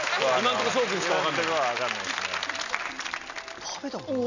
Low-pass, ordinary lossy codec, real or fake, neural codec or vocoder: 7.2 kHz; none; real; none